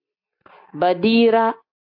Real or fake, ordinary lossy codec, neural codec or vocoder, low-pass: real; AAC, 32 kbps; none; 5.4 kHz